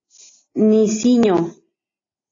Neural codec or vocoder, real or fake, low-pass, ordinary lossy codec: none; real; 7.2 kHz; AAC, 32 kbps